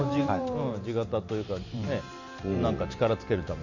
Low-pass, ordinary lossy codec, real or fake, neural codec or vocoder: 7.2 kHz; none; fake; vocoder, 44.1 kHz, 128 mel bands every 512 samples, BigVGAN v2